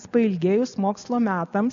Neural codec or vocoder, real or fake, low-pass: none; real; 7.2 kHz